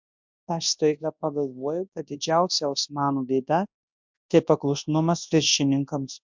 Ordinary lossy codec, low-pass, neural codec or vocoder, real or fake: MP3, 64 kbps; 7.2 kHz; codec, 24 kHz, 0.9 kbps, WavTokenizer, large speech release; fake